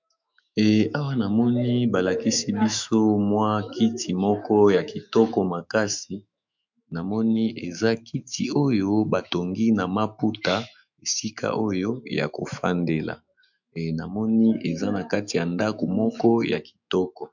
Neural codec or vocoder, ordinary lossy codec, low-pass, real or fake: autoencoder, 48 kHz, 128 numbers a frame, DAC-VAE, trained on Japanese speech; MP3, 64 kbps; 7.2 kHz; fake